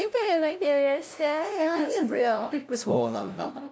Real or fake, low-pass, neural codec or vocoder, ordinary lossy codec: fake; none; codec, 16 kHz, 0.5 kbps, FunCodec, trained on LibriTTS, 25 frames a second; none